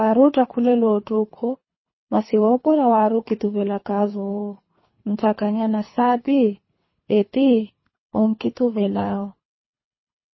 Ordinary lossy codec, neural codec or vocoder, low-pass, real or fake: MP3, 24 kbps; codec, 24 kHz, 3 kbps, HILCodec; 7.2 kHz; fake